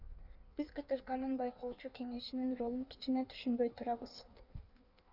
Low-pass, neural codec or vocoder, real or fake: 5.4 kHz; codec, 16 kHz in and 24 kHz out, 1.1 kbps, FireRedTTS-2 codec; fake